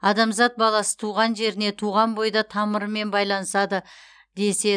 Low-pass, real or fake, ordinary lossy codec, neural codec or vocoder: 9.9 kHz; real; none; none